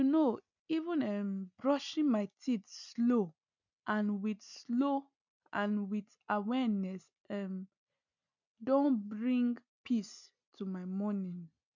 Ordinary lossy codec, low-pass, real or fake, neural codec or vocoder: none; 7.2 kHz; real; none